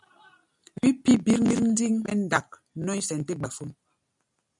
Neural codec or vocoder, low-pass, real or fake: none; 10.8 kHz; real